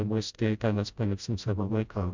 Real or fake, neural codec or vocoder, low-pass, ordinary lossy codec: fake; codec, 16 kHz, 0.5 kbps, FreqCodec, smaller model; 7.2 kHz; Opus, 64 kbps